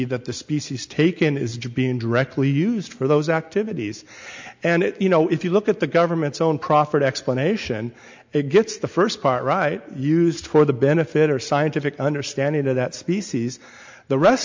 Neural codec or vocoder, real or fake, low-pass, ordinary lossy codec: none; real; 7.2 kHz; MP3, 64 kbps